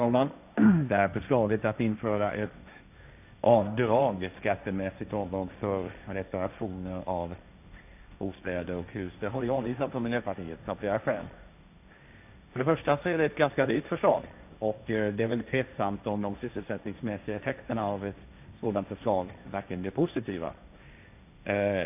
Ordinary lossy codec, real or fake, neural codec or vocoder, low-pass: none; fake; codec, 16 kHz, 1.1 kbps, Voila-Tokenizer; 3.6 kHz